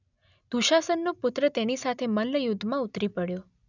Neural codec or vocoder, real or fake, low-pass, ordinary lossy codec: none; real; 7.2 kHz; none